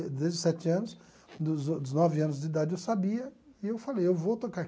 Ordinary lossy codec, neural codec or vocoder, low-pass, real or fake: none; none; none; real